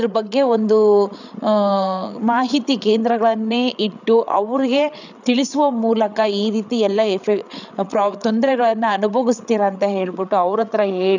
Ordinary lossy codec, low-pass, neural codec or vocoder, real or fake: none; 7.2 kHz; codec, 16 kHz, 8 kbps, FreqCodec, larger model; fake